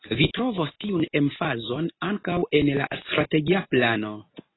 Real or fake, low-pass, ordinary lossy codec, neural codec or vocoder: real; 7.2 kHz; AAC, 16 kbps; none